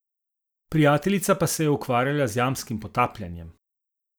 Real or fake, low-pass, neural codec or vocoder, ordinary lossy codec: real; none; none; none